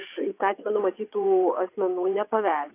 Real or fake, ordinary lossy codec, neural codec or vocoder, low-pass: fake; AAC, 24 kbps; vocoder, 22.05 kHz, 80 mel bands, WaveNeXt; 3.6 kHz